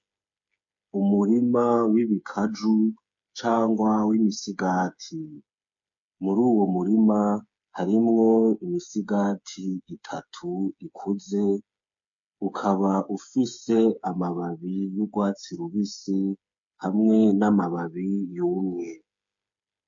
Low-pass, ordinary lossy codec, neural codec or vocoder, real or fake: 7.2 kHz; MP3, 48 kbps; codec, 16 kHz, 8 kbps, FreqCodec, smaller model; fake